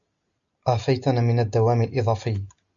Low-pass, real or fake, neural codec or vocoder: 7.2 kHz; real; none